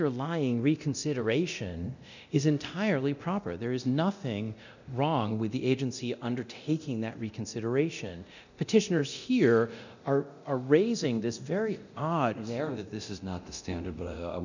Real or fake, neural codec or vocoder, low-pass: fake; codec, 24 kHz, 0.9 kbps, DualCodec; 7.2 kHz